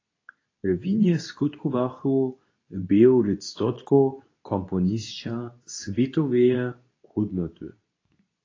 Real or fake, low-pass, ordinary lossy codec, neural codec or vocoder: fake; 7.2 kHz; AAC, 32 kbps; codec, 24 kHz, 0.9 kbps, WavTokenizer, medium speech release version 2